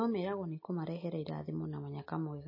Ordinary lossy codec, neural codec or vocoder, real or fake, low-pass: MP3, 24 kbps; none; real; 5.4 kHz